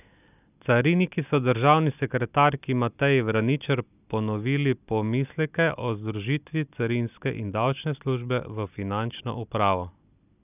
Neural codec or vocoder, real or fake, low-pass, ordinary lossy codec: none; real; 3.6 kHz; none